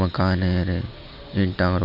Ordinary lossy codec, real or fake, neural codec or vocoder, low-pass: none; real; none; 5.4 kHz